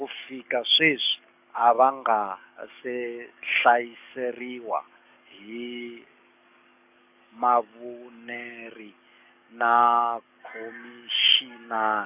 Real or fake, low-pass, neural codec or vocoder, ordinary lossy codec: fake; 3.6 kHz; codec, 44.1 kHz, 7.8 kbps, DAC; none